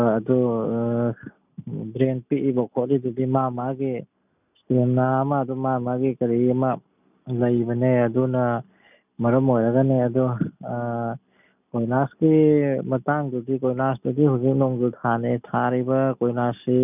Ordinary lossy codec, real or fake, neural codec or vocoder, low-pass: none; real; none; 3.6 kHz